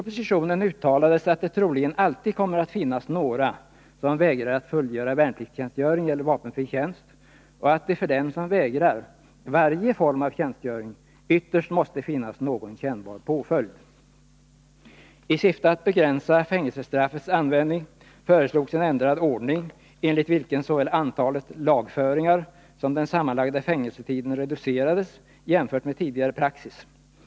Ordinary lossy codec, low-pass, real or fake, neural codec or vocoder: none; none; real; none